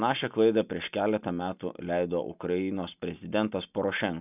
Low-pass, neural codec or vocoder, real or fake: 3.6 kHz; none; real